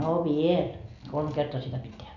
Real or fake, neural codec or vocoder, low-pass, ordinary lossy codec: real; none; 7.2 kHz; none